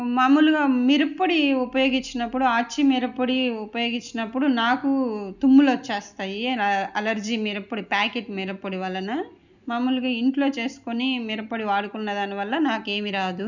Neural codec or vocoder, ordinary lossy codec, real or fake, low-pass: none; none; real; 7.2 kHz